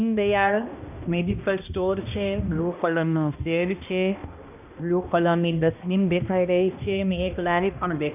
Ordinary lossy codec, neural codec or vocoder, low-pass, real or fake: none; codec, 16 kHz, 1 kbps, X-Codec, HuBERT features, trained on balanced general audio; 3.6 kHz; fake